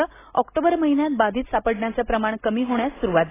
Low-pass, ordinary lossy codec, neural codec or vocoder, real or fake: 3.6 kHz; AAC, 16 kbps; none; real